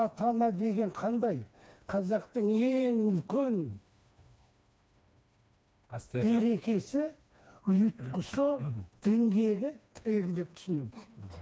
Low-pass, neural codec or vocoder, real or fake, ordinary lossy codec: none; codec, 16 kHz, 2 kbps, FreqCodec, smaller model; fake; none